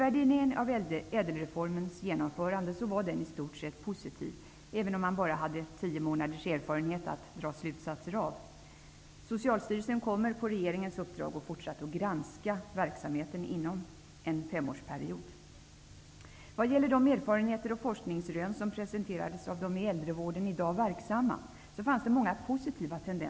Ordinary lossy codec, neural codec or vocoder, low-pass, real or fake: none; none; none; real